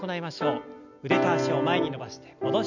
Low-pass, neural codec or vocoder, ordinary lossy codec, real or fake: 7.2 kHz; none; none; real